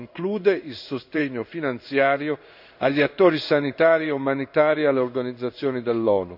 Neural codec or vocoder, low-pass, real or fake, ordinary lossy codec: codec, 16 kHz in and 24 kHz out, 1 kbps, XY-Tokenizer; 5.4 kHz; fake; none